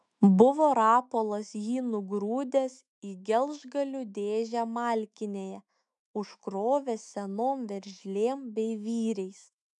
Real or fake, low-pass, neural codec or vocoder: fake; 10.8 kHz; autoencoder, 48 kHz, 128 numbers a frame, DAC-VAE, trained on Japanese speech